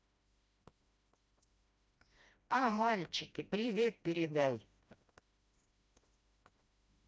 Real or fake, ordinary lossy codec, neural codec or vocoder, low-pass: fake; none; codec, 16 kHz, 1 kbps, FreqCodec, smaller model; none